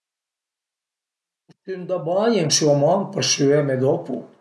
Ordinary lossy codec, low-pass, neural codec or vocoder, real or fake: none; none; none; real